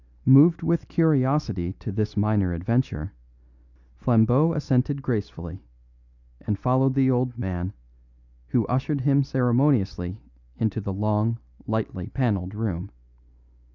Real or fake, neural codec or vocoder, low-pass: real; none; 7.2 kHz